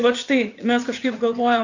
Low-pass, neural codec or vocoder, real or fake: 7.2 kHz; vocoder, 44.1 kHz, 80 mel bands, Vocos; fake